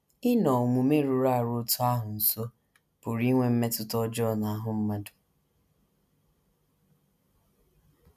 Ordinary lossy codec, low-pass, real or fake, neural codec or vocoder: none; 14.4 kHz; real; none